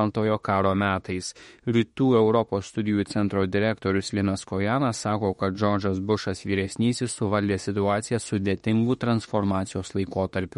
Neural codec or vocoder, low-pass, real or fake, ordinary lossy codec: autoencoder, 48 kHz, 32 numbers a frame, DAC-VAE, trained on Japanese speech; 19.8 kHz; fake; MP3, 48 kbps